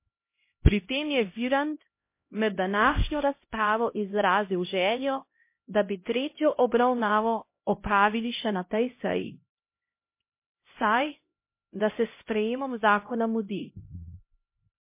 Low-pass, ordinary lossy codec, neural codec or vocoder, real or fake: 3.6 kHz; MP3, 24 kbps; codec, 16 kHz, 0.5 kbps, X-Codec, HuBERT features, trained on LibriSpeech; fake